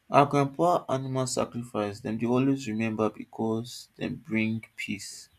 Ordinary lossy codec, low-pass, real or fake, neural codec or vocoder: none; 14.4 kHz; real; none